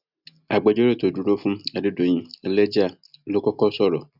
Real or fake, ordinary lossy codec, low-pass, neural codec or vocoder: real; none; 5.4 kHz; none